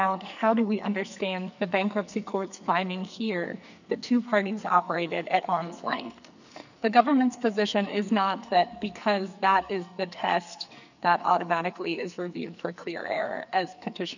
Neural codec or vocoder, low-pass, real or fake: codec, 44.1 kHz, 2.6 kbps, SNAC; 7.2 kHz; fake